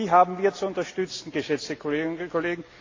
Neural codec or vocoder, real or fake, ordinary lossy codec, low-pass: none; real; AAC, 32 kbps; 7.2 kHz